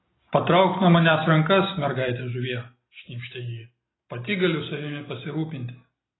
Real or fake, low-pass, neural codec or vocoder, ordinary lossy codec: real; 7.2 kHz; none; AAC, 16 kbps